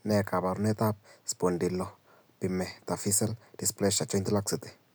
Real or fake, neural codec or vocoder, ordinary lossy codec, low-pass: real; none; none; none